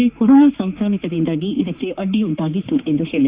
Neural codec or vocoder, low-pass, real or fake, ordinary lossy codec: codec, 16 kHz, 4 kbps, X-Codec, HuBERT features, trained on general audio; 3.6 kHz; fake; Opus, 64 kbps